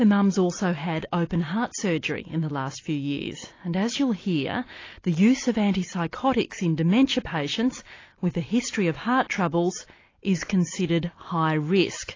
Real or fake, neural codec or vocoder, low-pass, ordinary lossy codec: real; none; 7.2 kHz; AAC, 32 kbps